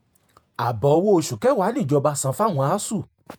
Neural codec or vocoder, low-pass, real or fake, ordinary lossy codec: vocoder, 48 kHz, 128 mel bands, Vocos; none; fake; none